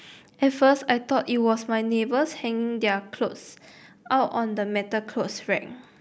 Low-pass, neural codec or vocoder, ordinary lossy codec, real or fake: none; none; none; real